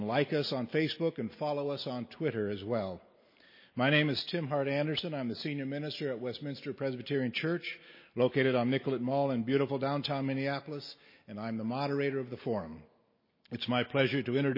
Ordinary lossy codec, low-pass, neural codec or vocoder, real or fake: MP3, 24 kbps; 5.4 kHz; none; real